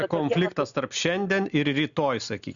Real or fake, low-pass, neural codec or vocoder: real; 7.2 kHz; none